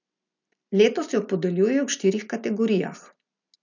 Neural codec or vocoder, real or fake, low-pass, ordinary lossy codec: none; real; 7.2 kHz; none